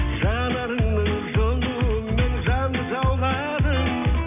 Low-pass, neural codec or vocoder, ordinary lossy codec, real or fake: 3.6 kHz; none; none; real